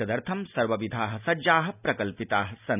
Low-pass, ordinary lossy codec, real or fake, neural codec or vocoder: 3.6 kHz; none; real; none